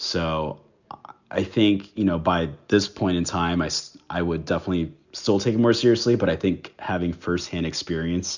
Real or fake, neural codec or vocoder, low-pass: real; none; 7.2 kHz